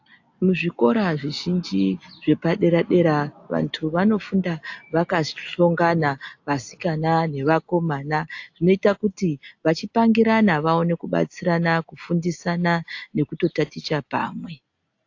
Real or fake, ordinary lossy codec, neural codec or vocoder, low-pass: real; AAC, 48 kbps; none; 7.2 kHz